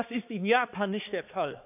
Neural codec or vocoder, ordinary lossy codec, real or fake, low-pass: codec, 16 kHz, 2 kbps, X-Codec, HuBERT features, trained on balanced general audio; none; fake; 3.6 kHz